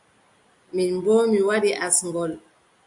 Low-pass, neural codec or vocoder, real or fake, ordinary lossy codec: 10.8 kHz; none; real; MP3, 64 kbps